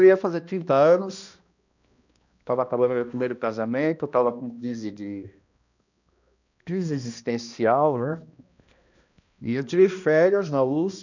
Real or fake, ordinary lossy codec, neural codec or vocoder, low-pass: fake; none; codec, 16 kHz, 1 kbps, X-Codec, HuBERT features, trained on balanced general audio; 7.2 kHz